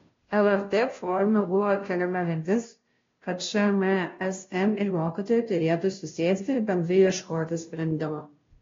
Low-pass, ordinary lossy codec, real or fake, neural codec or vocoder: 7.2 kHz; AAC, 32 kbps; fake; codec, 16 kHz, 0.5 kbps, FunCodec, trained on Chinese and English, 25 frames a second